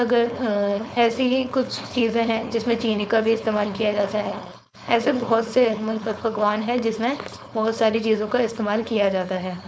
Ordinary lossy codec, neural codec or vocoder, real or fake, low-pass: none; codec, 16 kHz, 4.8 kbps, FACodec; fake; none